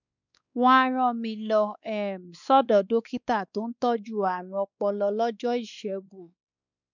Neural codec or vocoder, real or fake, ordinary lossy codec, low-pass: codec, 16 kHz, 2 kbps, X-Codec, WavLM features, trained on Multilingual LibriSpeech; fake; none; 7.2 kHz